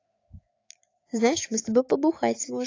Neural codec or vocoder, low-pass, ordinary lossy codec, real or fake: autoencoder, 48 kHz, 128 numbers a frame, DAC-VAE, trained on Japanese speech; 7.2 kHz; AAC, 32 kbps; fake